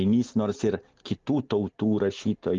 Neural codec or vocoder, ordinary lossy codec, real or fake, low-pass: none; Opus, 32 kbps; real; 7.2 kHz